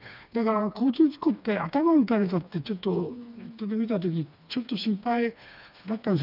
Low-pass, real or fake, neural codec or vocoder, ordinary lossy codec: 5.4 kHz; fake; codec, 16 kHz, 2 kbps, FreqCodec, smaller model; none